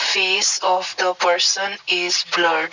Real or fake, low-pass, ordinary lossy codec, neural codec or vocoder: real; 7.2 kHz; Opus, 64 kbps; none